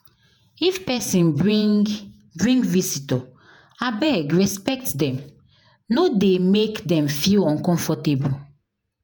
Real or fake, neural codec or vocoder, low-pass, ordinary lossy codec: fake; vocoder, 48 kHz, 128 mel bands, Vocos; none; none